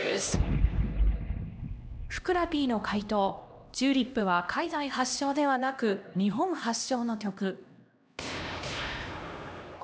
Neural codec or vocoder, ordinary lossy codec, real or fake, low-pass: codec, 16 kHz, 1 kbps, X-Codec, HuBERT features, trained on LibriSpeech; none; fake; none